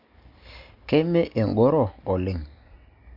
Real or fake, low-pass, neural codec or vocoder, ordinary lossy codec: real; 5.4 kHz; none; Opus, 64 kbps